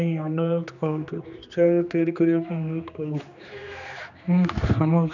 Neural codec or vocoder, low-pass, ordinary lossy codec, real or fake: codec, 16 kHz, 2 kbps, X-Codec, HuBERT features, trained on general audio; 7.2 kHz; none; fake